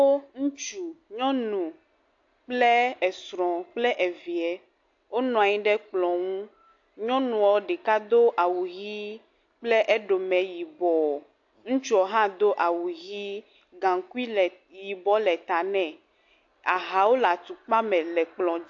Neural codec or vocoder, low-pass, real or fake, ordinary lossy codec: none; 7.2 kHz; real; AAC, 48 kbps